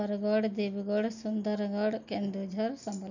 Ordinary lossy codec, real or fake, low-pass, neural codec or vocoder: none; real; 7.2 kHz; none